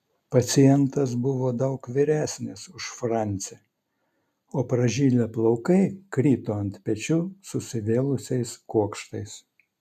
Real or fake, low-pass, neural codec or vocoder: real; 14.4 kHz; none